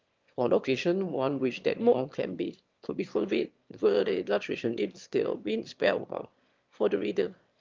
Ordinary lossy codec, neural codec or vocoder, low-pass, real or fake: Opus, 24 kbps; autoencoder, 22.05 kHz, a latent of 192 numbers a frame, VITS, trained on one speaker; 7.2 kHz; fake